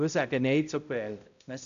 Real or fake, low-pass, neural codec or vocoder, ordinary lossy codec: fake; 7.2 kHz; codec, 16 kHz, 0.5 kbps, X-Codec, HuBERT features, trained on balanced general audio; none